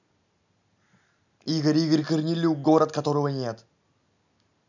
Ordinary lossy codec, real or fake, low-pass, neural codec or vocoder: none; real; 7.2 kHz; none